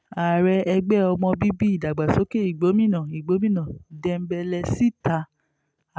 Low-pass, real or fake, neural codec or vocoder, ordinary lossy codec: none; real; none; none